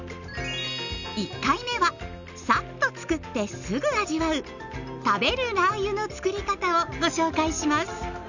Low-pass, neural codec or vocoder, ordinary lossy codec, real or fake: 7.2 kHz; none; none; real